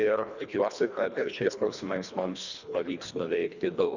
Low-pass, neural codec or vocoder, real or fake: 7.2 kHz; codec, 24 kHz, 1.5 kbps, HILCodec; fake